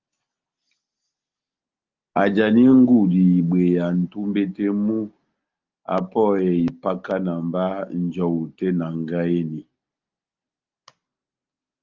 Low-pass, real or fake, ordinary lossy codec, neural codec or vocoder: 7.2 kHz; real; Opus, 24 kbps; none